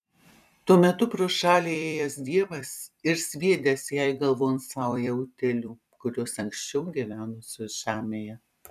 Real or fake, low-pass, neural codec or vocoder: fake; 14.4 kHz; vocoder, 44.1 kHz, 128 mel bands every 256 samples, BigVGAN v2